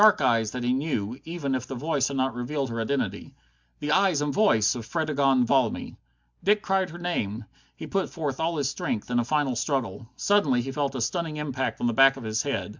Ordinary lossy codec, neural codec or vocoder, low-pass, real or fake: MP3, 64 kbps; none; 7.2 kHz; real